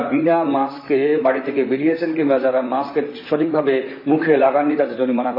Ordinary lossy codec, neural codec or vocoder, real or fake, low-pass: AAC, 32 kbps; vocoder, 44.1 kHz, 128 mel bands, Pupu-Vocoder; fake; 5.4 kHz